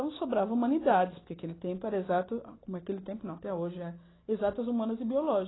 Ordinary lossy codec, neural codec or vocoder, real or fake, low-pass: AAC, 16 kbps; none; real; 7.2 kHz